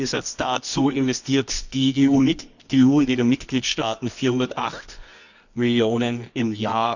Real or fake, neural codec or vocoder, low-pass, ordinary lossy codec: fake; codec, 24 kHz, 0.9 kbps, WavTokenizer, medium music audio release; 7.2 kHz; none